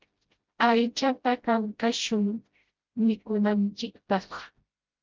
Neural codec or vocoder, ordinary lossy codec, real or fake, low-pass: codec, 16 kHz, 0.5 kbps, FreqCodec, smaller model; Opus, 24 kbps; fake; 7.2 kHz